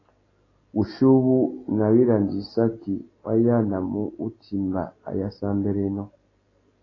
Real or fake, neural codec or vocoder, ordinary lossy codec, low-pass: real; none; AAC, 32 kbps; 7.2 kHz